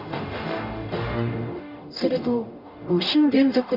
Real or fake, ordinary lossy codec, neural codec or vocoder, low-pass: fake; none; codec, 44.1 kHz, 0.9 kbps, DAC; 5.4 kHz